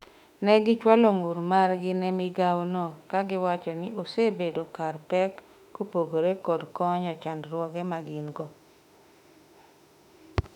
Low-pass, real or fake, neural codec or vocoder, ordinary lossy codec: 19.8 kHz; fake; autoencoder, 48 kHz, 32 numbers a frame, DAC-VAE, trained on Japanese speech; none